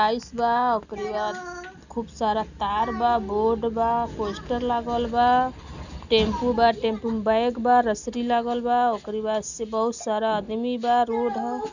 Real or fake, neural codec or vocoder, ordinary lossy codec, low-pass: real; none; none; 7.2 kHz